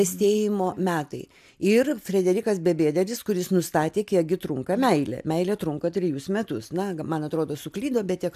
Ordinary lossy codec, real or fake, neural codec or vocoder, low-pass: AAC, 96 kbps; real; none; 14.4 kHz